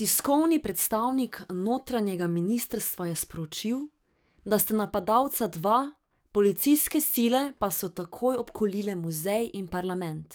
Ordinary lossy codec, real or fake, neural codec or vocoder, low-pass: none; fake; codec, 44.1 kHz, 7.8 kbps, DAC; none